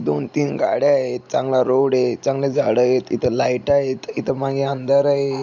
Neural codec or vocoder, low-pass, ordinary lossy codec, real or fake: none; 7.2 kHz; none; real